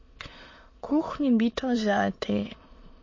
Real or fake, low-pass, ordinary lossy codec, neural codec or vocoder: fake; 7.2 kHz; MP3, 32 kbps; autoencoder, 22.05 kHz, a latent of 192 numbers a frame, VITS, trained on many speakers